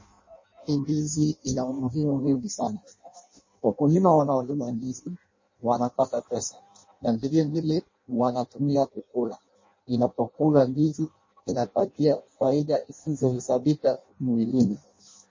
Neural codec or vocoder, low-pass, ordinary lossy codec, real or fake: codec, 16 kHz in and 24 kHz out, 0.6 kbps, FireRedTTS-2 codec; 7.2 kHz; MP3, 32 kbps; fake